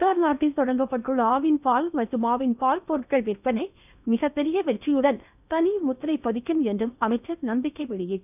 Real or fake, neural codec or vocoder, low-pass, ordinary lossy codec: fake; codec, 16 kHz in and 24 kHz out, 0.8 kbps, FocalCodec, streaming, 65536 codes; 3.6 kHz; none